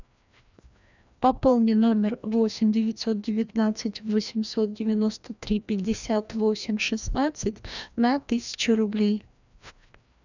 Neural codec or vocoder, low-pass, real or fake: codec, 16 kHz, 1 kbps, FreqCodec, larger model; 7.2 kHz; fake